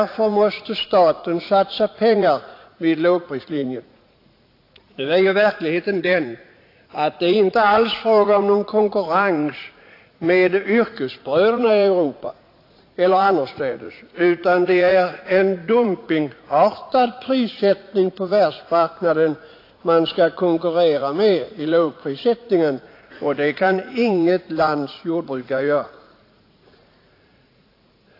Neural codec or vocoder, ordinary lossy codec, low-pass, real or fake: vocoder, 44.1 kHz, 80 mel bands, Vocos; AAC, 32 kbps; 5.4 kHz; fake